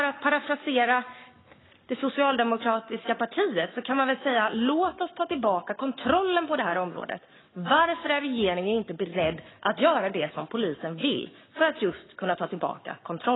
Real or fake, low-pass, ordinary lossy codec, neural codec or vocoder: fake; 7.2 kHz; AAC, 16 kbps; codec, 44.1 kHz, 7.8 kbps, Pupu-Codec